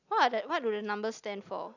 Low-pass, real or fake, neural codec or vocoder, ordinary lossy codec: 7.2 kHz; real; none; none